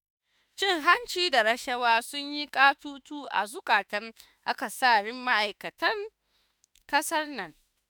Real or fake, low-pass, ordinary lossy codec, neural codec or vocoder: fake; none; none; autoencoder, 48 kHz, 32 numbers a frame, DAC-VAE, trained on Japanese speech